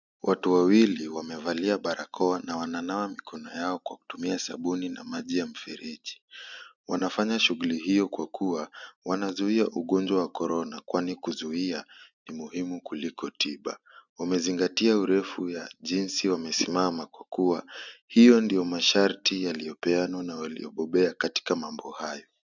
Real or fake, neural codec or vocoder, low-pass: real; none; 7.2 kHz